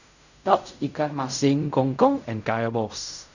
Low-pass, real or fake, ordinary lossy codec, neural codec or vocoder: 7.2 kHz; fake; none; codec, 16 kHz in and 24 kHz out, 0.4 kbps, LongCat-Audio-Codec, fine tuned four codebook decoder